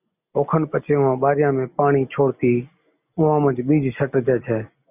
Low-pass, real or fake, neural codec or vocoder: 3.6 kHz; real; none